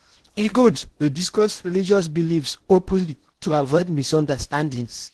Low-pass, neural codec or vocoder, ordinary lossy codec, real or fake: 10.8 kHz; codec, 16 kHz in and 24 kHz out, 0.6 kbps, FocalCodec, streaming, 2048 codes; Opus, 16 kbps; fake